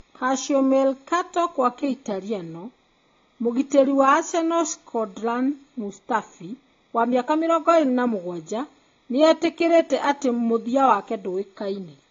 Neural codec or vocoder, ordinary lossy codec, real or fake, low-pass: none; AAC, 32 kbps; real; 7.2 kHz